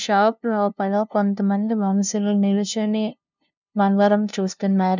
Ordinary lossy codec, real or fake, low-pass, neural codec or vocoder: none; fake; 7.2 kHz; codec, 16 kHz, 0.5 kbps, FunCodec, trained on LibriTTS, 25 frames a second